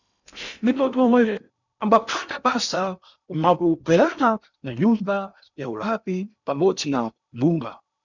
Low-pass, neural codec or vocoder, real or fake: 7.2 kHz; codec, 16 kHz in and 24 kHz out, 0.8 kbps, FocalCodec, streaming, 65536 codes; fake